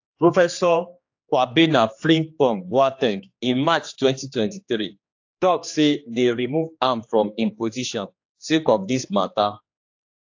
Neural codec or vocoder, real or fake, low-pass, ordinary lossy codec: codec, 16 kHz, 2 kbps, X-Codec, HuBERT features, trained on general audio; fake; 7.2 kHz; AAC, 48 kbps